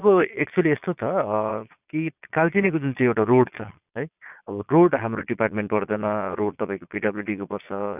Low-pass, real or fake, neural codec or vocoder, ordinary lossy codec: 3.6 kHz; fake; vocoder, 22.05 kHz, 80 mel bands, Vocos; none